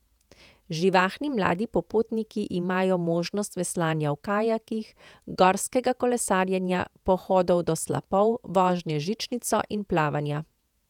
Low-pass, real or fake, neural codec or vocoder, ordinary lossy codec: 19.8 kHz; fake; vocoder, 48 kHz, 128 mel bands, Vocos; none